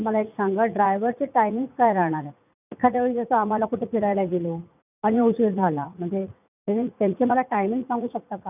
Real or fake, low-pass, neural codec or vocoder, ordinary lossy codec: fake; 3.6 kHz; vocoder, 44.1 kHz, 80 mel bands, Vocos; none